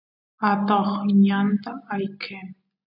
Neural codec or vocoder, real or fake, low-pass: none; real; 5.4 kHz